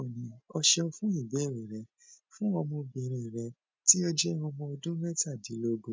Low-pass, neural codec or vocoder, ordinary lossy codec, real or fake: none; none; none; real